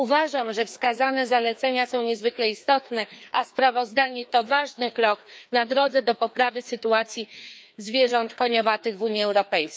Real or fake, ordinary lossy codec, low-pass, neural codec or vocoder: fake; none; none; codec, 16 kHz, 2 kbps, FreqCodec, larger model